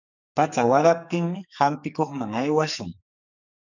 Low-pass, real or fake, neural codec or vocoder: 7.2 kHz; fake; codec, 44.1 kHz, 2.6 kbps, SNAC